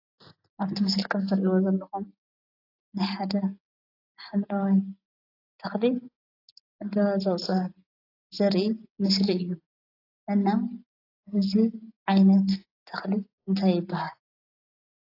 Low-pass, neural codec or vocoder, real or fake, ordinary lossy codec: 5.4 kHz; none; real; AAC, 32 kbps